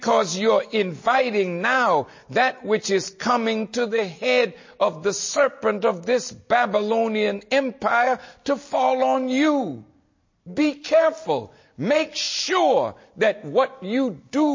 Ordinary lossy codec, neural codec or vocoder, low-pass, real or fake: MP3, 32 kbps; none; 7.2 kHz; real